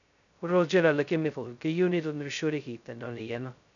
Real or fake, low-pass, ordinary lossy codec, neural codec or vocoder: fake; 7.2 kHz; none; codec, 16 kHz, 0.2 kbps, FocalCodec